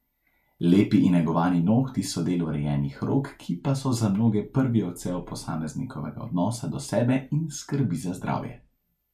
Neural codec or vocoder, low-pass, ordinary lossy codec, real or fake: none; 14.4 kHz; none; real